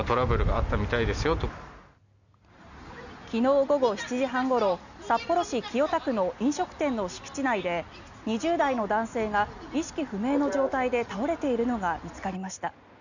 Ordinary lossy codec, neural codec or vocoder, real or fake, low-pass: none; none; real; 7.2 kHz